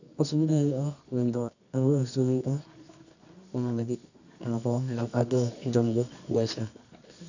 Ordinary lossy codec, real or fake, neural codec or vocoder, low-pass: none; fake; codec, 24 kHz, 0.9 kbps, WavTokenizer, medium music audio release; 7.2 kHz